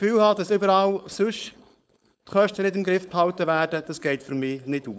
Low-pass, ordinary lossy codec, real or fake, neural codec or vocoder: none; none; fake; codec, 16 kHz, 4.8 kbps, FACodec